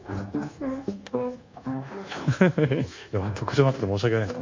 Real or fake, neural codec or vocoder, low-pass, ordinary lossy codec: fake; codec, 24 kHz, 1.2 kbps, DualCodec; 7.2 kHz; MP3, 48 kbps